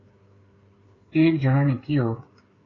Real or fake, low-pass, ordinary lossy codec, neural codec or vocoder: fake; 7.2 kHz; AAC, 32 kbps; codec, 16 kHz, 8 kbps, FreqCodec, smaller model